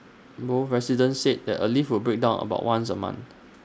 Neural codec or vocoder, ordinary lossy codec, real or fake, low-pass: none; none; real; none